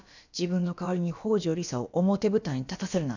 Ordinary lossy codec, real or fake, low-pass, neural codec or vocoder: none; fake; 7.2 kHz; codec, 16 kHz, about 1 kbps, DyCAST, with the encoder's durations